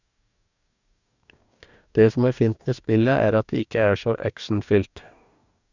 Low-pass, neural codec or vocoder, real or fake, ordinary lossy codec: 7.2 kHz; codec, 44.1 kHz, 2.6 kbps, DAC; fake; none